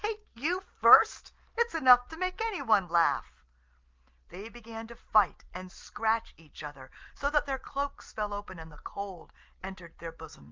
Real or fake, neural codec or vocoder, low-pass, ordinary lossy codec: real; none; 7.2 kHz; Opus, 32 kbps